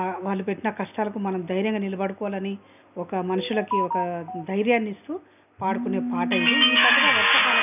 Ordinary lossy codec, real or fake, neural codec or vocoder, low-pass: none; real; none; 3.6 kHz